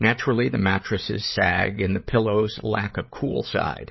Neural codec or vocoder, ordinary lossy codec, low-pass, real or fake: codec, 16 kHz, 8 kbps, FreqCodec, larger model; MP3, 24 kbps; 7.2 kHz; fake